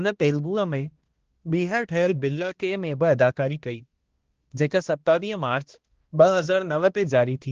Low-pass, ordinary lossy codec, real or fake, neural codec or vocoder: 7.2 kHz; Opus, 16 kbps; fake; codec, 16 kHz, 1 kbps, X-Codec, HuBERT features, trained on balanced general audio